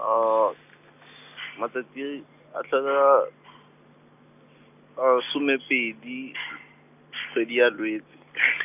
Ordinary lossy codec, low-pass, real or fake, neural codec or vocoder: none; 3.6 kHz; real; none